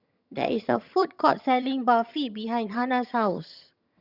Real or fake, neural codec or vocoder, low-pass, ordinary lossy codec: fake; vocoder, 22.05 kHz, 80 mel bands, HiFi-GAN; 5.4 kHz; Opus, 64 kbps